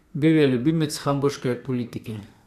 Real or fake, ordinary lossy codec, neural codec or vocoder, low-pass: fake; none; codec, 32 kHz, 1.9 kbps, SNAC; 14.4 kHz